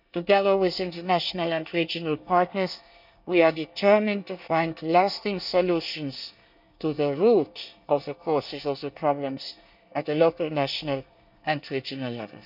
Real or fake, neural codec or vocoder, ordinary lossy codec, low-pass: fake; codec, 24 kHz, 1 kbps, SNAC; none; 5.4 kHz